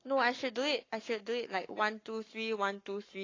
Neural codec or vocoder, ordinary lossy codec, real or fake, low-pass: codec, 44.1 kHz, 7.8 kbps, Pupu-Codec; AAC, 32 kbps; fake; 7.2 kHz